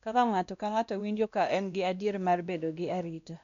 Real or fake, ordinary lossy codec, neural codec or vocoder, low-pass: fake; none; codec, 16 kHz, 1 kbps, X-Codec, WavLM features, trained on Multilingual LibriSpeech; 7.2 kHz